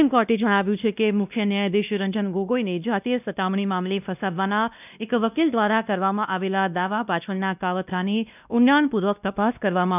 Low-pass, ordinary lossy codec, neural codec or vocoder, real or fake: 3.6 kHz; none; codec, 16 kHz, 1 kbps, X-Codec, WavLM features, trained on Multilingual LibriSpeech; fake